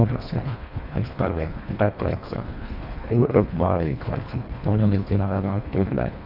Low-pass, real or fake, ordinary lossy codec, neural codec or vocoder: 5.4 kHz; fake; none; codec, 24 kHz, 1.5 kbps, HILCodec